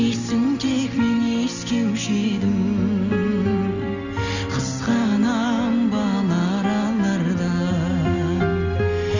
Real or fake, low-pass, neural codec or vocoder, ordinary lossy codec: real; 7.2 kHz; none; none